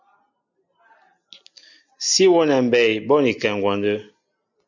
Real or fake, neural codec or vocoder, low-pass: real; none; 7.2 kHz